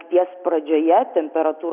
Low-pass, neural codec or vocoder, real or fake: 3.6 kHz; none; real